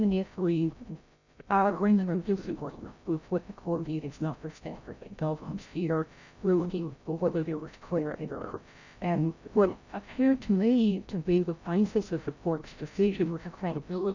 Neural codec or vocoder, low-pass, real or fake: codec, 16 kHz, 0.5 kbps, FreqCodec, larger model; 7.2 kHz; fake